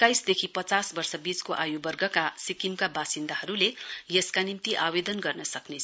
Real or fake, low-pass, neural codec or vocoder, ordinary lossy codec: real; none; none; none